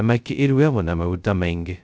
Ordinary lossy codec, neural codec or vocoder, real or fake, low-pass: none; codec, 16 kHz, 0.2 kbps, FocalCodec; fake; none